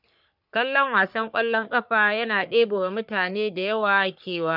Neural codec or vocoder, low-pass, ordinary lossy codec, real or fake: codec, 44.1 kHz, 3.4 kbps, Pupu-Codec; 5.4 kHz; none; fake